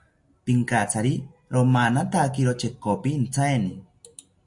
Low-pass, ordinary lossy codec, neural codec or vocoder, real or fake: 10.8 kHz; AAC, 64 kbps; none; real